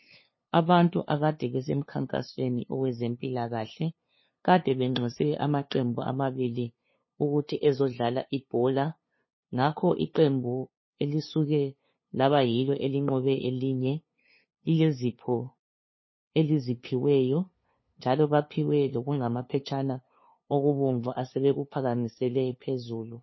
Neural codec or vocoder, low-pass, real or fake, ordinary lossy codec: codec, 16 kHz, 2 kbps, FunCodec, trained on LibriTTS, 25 frames a second; 7.2 kHz; fake; MP3, 24 kbps